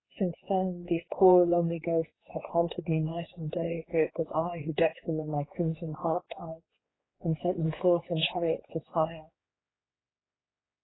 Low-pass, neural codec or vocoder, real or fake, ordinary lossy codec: 7.2 kHz; codec, 16 kHz, 4 kbps, X-Codec, HuBERT features, trained on general audio; fake; AAC, 16 kbps